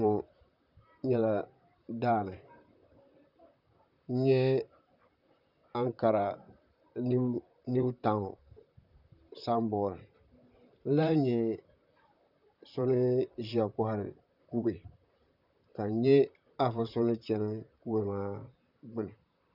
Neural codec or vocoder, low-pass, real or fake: vocoder, 44.1 kHz, 128 mel bands, Pupu-Vocoder; 5.4 kHz; fake